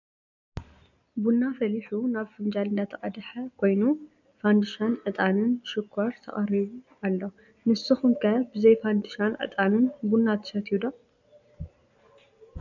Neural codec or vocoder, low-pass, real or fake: none; 7.2 kHz; real